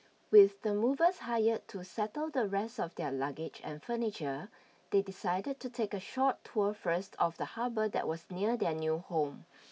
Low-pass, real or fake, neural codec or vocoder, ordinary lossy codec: none; real; none; none